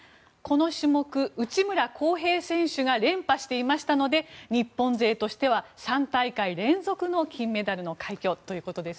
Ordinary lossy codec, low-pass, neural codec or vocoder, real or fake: none; none; none; real